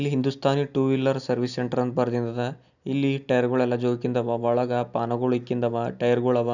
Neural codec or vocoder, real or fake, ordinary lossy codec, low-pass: none; real; none; 7.2 kHz